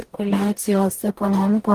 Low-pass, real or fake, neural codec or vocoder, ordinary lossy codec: 14.4 kHz; fake; codec, 44.1 kHz, 0.9 kbps, DAC; Opus, 24 kbps